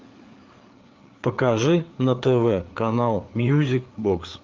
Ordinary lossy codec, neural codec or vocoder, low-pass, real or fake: Opus, 32 kbps; codec, 16 kHz, 4 kbps, FreqCodec, larger model; 7.2 kHz; fake